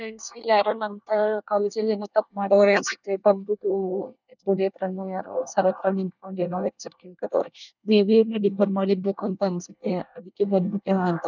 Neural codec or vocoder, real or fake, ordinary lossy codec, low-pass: codec, 24 kHz, 1 kbps, SNAC; fake; none; 7.2 kHz